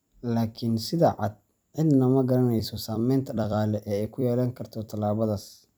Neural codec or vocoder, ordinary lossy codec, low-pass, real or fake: vocoder, 44.1 kHz, 128 mel bands every 256 samples, BigVGAN v2; none; none; fake